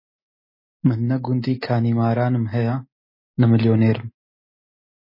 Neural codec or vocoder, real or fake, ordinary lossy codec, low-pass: none; real; MP3, 24 kbps; 5.4 kHz